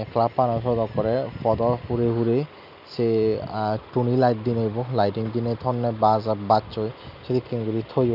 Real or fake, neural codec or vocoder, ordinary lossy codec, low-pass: real; none; none; 5.4 kHz